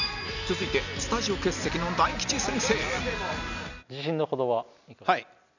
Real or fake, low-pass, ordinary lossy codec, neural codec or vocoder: real; 7.2 kHz; none; none